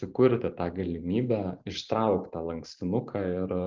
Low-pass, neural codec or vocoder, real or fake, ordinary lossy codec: 7.2 kHz; vocoder, 44.1 kHz, 128 mel bands every 512 samples, BigVGAN v2; fake; Opus, 32 kbps